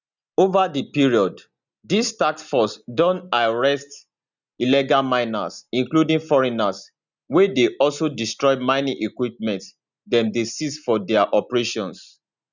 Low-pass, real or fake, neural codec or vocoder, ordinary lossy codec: 7.2 kHz; real; none; none